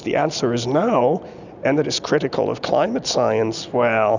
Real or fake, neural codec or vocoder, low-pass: real; none; 7.2 kHz